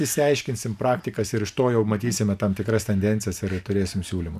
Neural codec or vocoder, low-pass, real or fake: vocoder, 44.1 kHz, 128 mel bands every 512 samples, BigVGAN v2; 14.4 kHz; fake